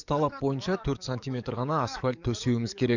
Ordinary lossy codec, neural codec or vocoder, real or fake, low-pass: none; codec, 44.1 kHz, 7.8 kbps, DAC; fake; 7.2 kHz